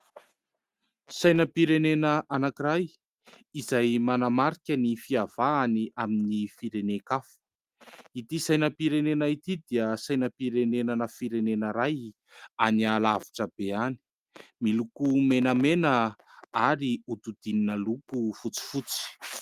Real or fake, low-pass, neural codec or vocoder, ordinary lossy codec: real; 14.4 kHz; none; Opus, 32 kbps